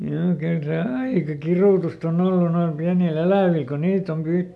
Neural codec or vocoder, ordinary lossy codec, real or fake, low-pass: none; none; real; none